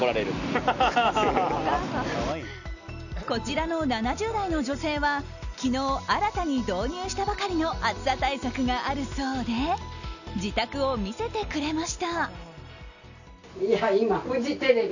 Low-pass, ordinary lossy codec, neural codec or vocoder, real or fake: 7.2 kHz; none; none; real